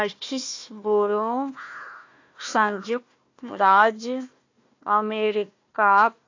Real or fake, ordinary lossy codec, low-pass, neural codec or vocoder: fake; AAC, 48 kbps; 7.2 kHz; codec, 16 kHz, 1 kbps, FunCodec, trained on Chinese and English, 50 frames a second